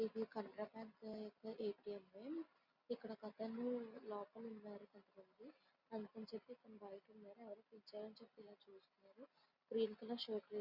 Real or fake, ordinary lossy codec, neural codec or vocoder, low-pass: real; Opus, 64 kbps; none; 5.4 kHz